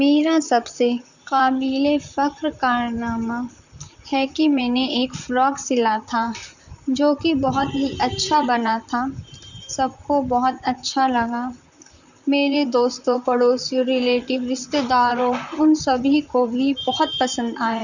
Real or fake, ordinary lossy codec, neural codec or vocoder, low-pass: fake; none; vocoder, 44.1 kHz, 128 mel bands, Pupu-Vocoder; 7.2 kHz